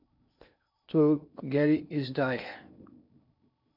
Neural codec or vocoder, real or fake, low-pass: codec, 16 kHz in and 24 kHz out, 0.8 kbps, FocalCodec, streaming, 65536 codes; fake; 5.4 kHz